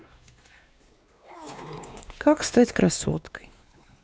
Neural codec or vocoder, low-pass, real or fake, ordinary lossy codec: codec, 16 kHz, 2 kbps, X-Codec, WavLM features, trained on Multilingual LibriSpeech; none; fake; none